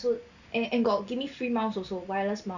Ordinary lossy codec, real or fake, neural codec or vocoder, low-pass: none; real; none; 7.2 kHz